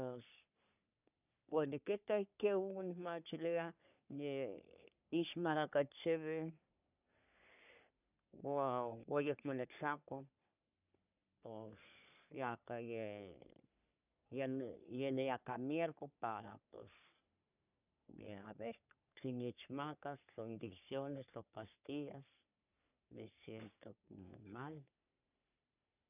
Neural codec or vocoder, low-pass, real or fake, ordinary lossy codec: codec, 44.1 kHz, 3.4 kbps, Pupu-Codec; 3.6 kHz; fake; none